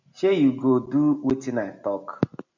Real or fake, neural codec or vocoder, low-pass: real; none; 7.2 kHz